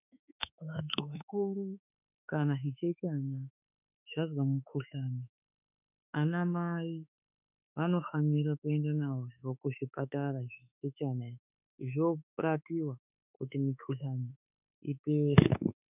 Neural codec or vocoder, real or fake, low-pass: autoencoder, 48 kHz, 32 numbers a frame, DAC-VAE, trained on Japanese speech; fake; 3.6 kHz